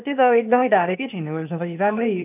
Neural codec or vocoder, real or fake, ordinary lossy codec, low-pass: codec, 16 kHz, 0.8 kbps, ZipCodec; fake; none; 3.6 kHz